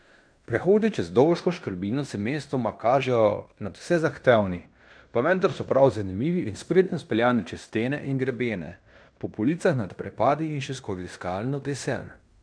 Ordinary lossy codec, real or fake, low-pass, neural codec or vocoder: none; fake; 9.9 kHz; codec, 16 kHz in and 24 kHz out, 0.9 kbps, LongCat-Audio-Codec, fine tuned four codebook decoder